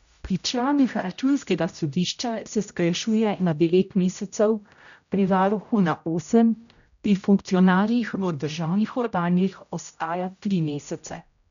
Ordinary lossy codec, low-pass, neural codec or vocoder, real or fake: none; 7.2 kHz; codec, 16 kHz, 0.5 kbps, X-Codec, HuBERT features, trained on general audio; fake